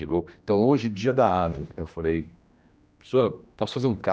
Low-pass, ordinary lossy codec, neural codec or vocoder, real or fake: none; none; codec, 16 kHz, 1 kbps, X-Codec, HuBERT features, trained on general audio; fake